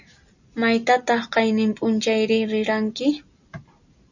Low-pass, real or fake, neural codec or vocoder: 7.2 kHz; real; none